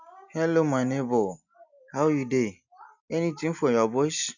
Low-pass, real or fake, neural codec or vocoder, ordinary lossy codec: 7.2 kHz; real; none; none